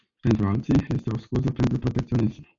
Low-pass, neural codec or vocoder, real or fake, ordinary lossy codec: 7.2 kHz; none; real; AAC, 48 kbps